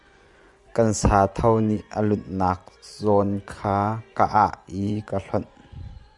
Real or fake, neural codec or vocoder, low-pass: fake; vocoder, 24 kHz, 100 mel bands, Vocos; 10.8 kHz